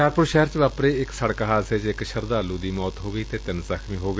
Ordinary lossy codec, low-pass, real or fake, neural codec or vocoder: none; none; real; none